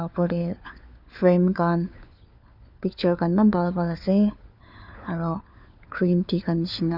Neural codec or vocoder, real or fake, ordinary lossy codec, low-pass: codec, 16 kHz, 4 kbps, FunCodec, trained on LibriTTS, 50 frames a second; fake; none; 5.4 kHz